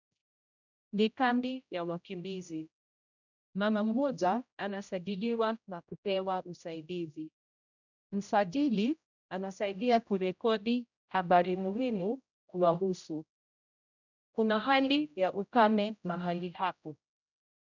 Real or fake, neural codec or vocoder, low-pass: fake; codec, 16 kHz, 0.5 kbps, X-Codec, HuBERT features, trained on general audio; 7.2 kHz